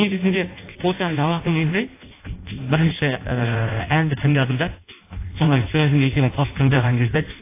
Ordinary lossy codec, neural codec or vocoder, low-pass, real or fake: AAC, 24 kbps; codec, 16 kHz in and 24 kHz out, 0.6 kbps, FireRedTTS-2 codec; 3.6 kHz; fake